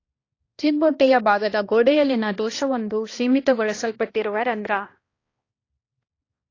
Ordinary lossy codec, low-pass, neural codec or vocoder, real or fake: AAC, 32 kbps; 7.2 kHz; codec, 16 kHz, 1 kbps, X-Codec, HuBERT features, trained on balanced general audio; fake